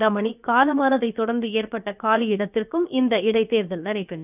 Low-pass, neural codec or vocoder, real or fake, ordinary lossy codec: 3.6 kHz; codec, 16 kHz, about 1 kbps, DyCAST, with the encoder's durations; fake; none